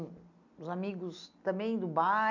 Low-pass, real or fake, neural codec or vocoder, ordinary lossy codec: 7.2 kHz; real; none; none